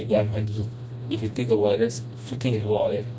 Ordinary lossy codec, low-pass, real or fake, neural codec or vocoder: none; none; fake; codec, 16 kHz, 1 kbps, FreqCodec, smaller model